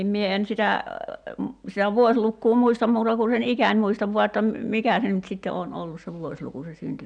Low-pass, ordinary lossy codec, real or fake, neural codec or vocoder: 9.9 kHz; none; real; none